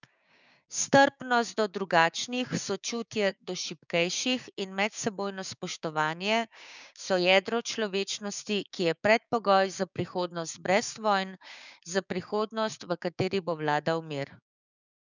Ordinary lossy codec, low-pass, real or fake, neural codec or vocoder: none; 7.2 kHz; fake; codec, 16 kHz, 6 kbps, DAC